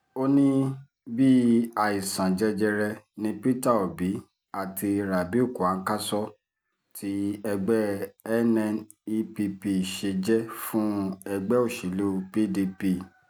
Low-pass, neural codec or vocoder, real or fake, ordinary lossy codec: none; none; real; none